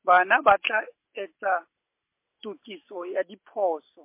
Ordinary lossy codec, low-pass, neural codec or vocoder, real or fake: MP3, 24 kbps; 3.6 kHz; none; real